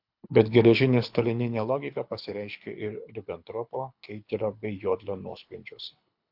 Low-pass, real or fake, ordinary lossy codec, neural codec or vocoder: 5.4 kHz; fake; AAC, 48 kbps; codec, 24 kHz, 6 kbps, HILCodec